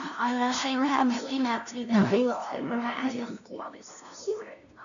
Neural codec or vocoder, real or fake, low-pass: codec, 16 kHz, 0.5 kbps, FunCodec, trained on LibriTTS, 25 frames a second; fake; 7.2 kHz